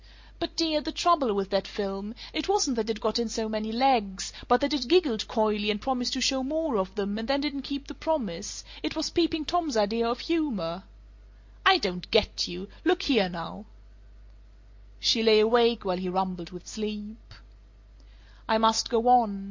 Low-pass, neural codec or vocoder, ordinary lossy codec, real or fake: 7.2 kHz; none; MP3, 48 kbps; real